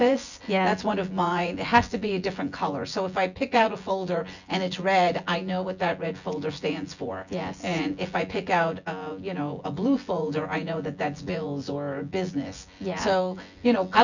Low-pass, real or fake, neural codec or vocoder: 7.2 kHz; fake; vocoder, 24 kHz, 100 mel bands, Vocos